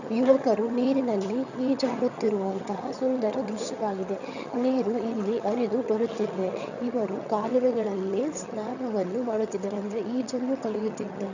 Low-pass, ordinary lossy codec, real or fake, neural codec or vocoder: 7.2 kHz; none; fake; vocoder, 22.05 kHz, 80 mel bands, HiFi-GAN